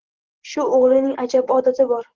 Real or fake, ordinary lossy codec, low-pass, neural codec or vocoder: real; Opus, 16 kbps; 7.2 kHz; none